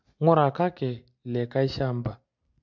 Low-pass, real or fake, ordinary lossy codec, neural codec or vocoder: 7.2 kHz; real; MP3, 64 kbps; none